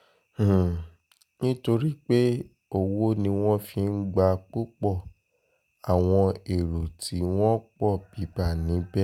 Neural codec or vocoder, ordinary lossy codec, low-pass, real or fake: none; none; 19.8 kHz; real